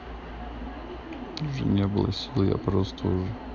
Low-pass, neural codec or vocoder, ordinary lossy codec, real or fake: 7.2 kHz; none; none; real